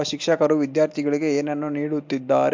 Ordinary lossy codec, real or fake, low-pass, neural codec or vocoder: MP3, 64 kbps; real; 7.2 kHz; none